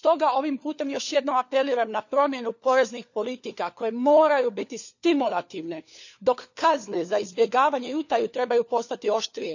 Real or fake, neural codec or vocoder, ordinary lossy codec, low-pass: fake; codec, 16 kHz, 4 kbps, FunCodec, trained on LibriTTS, 50 frames a second; none; 7.2 kHz